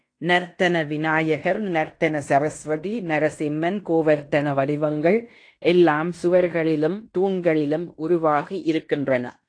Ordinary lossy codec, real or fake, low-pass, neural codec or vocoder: AAC, 48 kbps; fake; 9.9 kHz; codec, 16 kHz in and 24 kHz out, 0.9 kbps, LongCat-Audio-Codec, fine tuned four codebook decoder